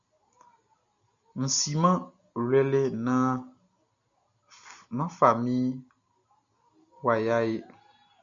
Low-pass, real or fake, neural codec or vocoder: 7.2 kHz; real; none